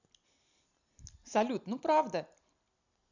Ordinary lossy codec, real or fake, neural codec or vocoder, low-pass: none; real; none; 7.2 kHz